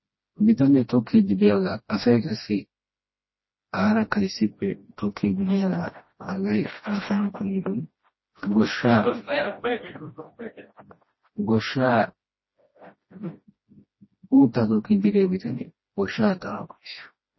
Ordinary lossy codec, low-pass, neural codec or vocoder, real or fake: MP3, 24 kbps; 7.2 kHz; codec, 16 kHz, 1 kbps, FreqCodec, smaller model; fake